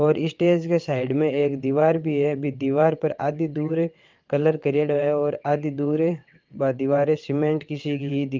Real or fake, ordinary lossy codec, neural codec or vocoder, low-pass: fake; Opus, 24 kbps; vocoder, 22.05 kHz, 80 mel bands, WaveNeXt; 7.2 kHz